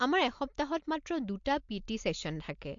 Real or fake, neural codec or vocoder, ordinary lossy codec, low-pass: real; none; MP3, 48 kbps; 7.2 kHz